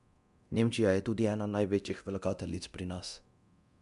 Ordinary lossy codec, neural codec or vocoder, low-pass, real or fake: MP3, 96 kbps; codec, 24 kHz, 0.9 kbps, DualCodec; 10.8 kHz; fake